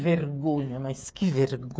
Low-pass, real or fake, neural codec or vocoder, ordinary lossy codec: none; fake; codec, 16 kHz, 8 kbps, FreqCodec, smaller model; none